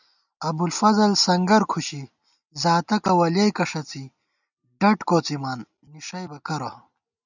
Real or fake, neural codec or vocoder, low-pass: real; none; 7.2 kHz